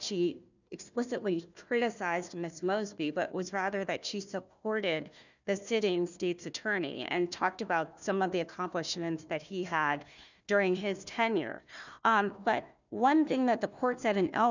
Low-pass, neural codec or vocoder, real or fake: 7.2 kHz; codec, 16 kHz, 1 kbps, FunCodec, trained on Chinese and English, 50 frames a second; fake